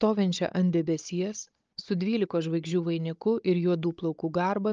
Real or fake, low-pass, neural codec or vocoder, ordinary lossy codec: fake; 7.2 kHz; codec, 16 kHz, 16 kbps, FunCodec, trained on Chinese and English, 50 frames a second; Opus, 24 kbps